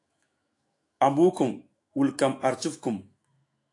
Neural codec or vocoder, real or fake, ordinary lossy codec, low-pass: autoencoder, 48 kHz, 128 numbers a frame, DAC-VAE, trained on Japanese speech; fake; AAC, 48 kbps; 10.8 kHz